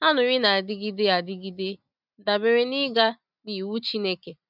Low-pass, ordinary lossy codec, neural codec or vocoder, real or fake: 5.4 kHz; none; none; real